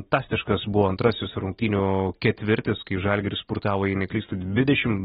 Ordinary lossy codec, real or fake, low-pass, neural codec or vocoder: AAC, 16 kbps; real; 19.8 kHz; none